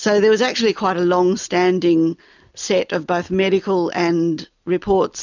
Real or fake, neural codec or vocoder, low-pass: real; none; 7.2 kHz